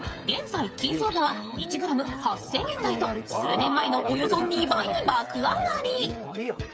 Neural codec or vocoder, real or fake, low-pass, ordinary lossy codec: codec, 16 kHz, 8 kbps, FreqCodec, smaller model; fake; none; none